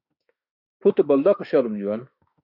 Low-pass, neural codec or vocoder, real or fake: 5.4 kHz; autoencoder, 48 kHz, 32 numbers a frame, DAC-VAE, trained on Japanese speech; fake